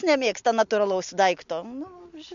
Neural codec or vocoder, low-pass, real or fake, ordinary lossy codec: none; 7.2 kHz; real; MP3, 64 kbps